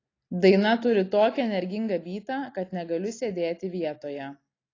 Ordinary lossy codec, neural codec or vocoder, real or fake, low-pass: AAC, 32 kbps; none; real; 7.2 kHz